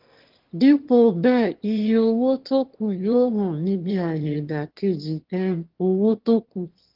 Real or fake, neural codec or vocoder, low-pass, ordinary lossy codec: fake; autoencoder, 22.05 kHz, a latent of 192 numbers a frame, VITS, trained on one speaker; 5.4 kHz; Opus, 16 kbps